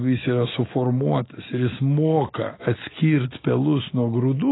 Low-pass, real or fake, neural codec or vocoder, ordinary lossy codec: 7.2 kHz; real; none; AAC, 16 kbps